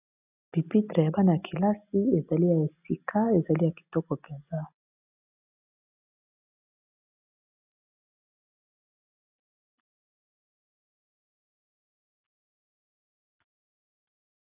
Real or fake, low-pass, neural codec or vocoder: real; 3.6 kHz; none